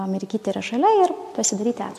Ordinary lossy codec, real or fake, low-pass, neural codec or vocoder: MP3, 64 kbps; real; 14.4 kHz; none